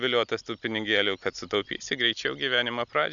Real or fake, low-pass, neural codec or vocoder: real; 7.2 kHz; none